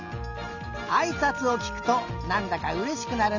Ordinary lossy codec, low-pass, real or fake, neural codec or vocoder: none; 7.2 kHz; real; none